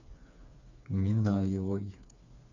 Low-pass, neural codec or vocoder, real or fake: 7.2 kHz; codec, 16 kHz, 4 kbps, FreqCodec, smaller model; fake